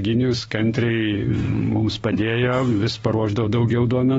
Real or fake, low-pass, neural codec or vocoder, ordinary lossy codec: fake; 19.8 kHz; autoencoder, 48 kHz, 32 numbers a frame, DAC-VAE, trained on Japanese speech; AAC, 24 kbps